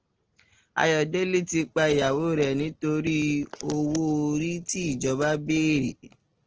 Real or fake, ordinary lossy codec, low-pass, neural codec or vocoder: real; Opus, 16 kbps; 7.2 kHz; none